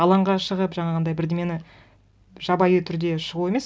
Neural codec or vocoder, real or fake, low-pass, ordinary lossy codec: none; real; none; none